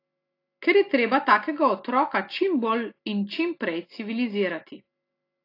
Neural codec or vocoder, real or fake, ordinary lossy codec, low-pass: none; real; AAC, 32 kbps; 5.4 kHz